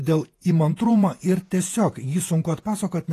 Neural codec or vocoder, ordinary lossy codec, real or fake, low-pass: vocoder, 48 kHz, 128 mel bands, Vocos; AAC, 48 kbps; fake; 14.4 kHz